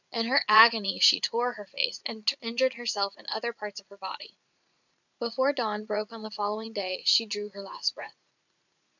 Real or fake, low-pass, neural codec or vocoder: fake; 7.2 kHz; vocoder, 44.1 kHz, 80 mel bands, Vocos